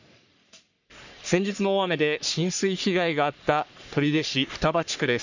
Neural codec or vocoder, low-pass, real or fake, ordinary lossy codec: codec, 44.1 kHz, 3.4 kbps, Pupu-Codec; 7.2 kHz; fake; none